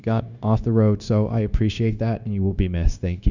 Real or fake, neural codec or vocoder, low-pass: fake; codec, 16 kHz, 0.9 kbps, LongCat-Audio-Codec; 7.2 kHz